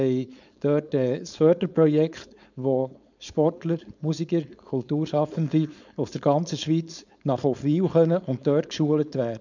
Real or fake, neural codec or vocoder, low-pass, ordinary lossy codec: fake; codec, 16 kHz, 4.8 kbps, FACodec; 7.2 kHz; none